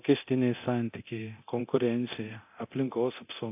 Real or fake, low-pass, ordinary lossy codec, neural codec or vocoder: fake; 3.6 kHz; AAC, 32 kbps; codec, 24 kHz, 0.9 kbps, DualCodec